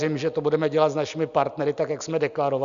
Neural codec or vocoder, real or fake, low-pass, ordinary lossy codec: none; real; 7.2 kHz; Opus, 64 kbps